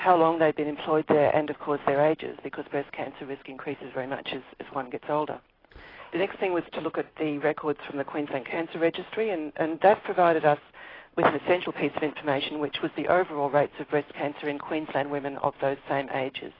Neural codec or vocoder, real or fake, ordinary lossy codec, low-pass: none; real; AAC, 24 kbps; 5.4 kHz